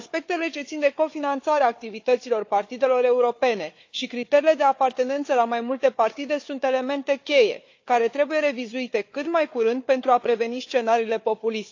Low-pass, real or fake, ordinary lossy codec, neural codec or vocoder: 7.2 kHz; fake; AAC, 48 kbps; codec, 16 kHz, 4 kbps, FunCodec, trained on Chinese and English, 50 frames a second